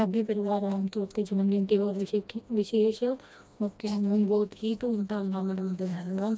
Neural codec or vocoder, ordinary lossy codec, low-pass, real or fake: codec, 16 kHz, 1 kbps, FreqCodec, smaller model; none; none; fake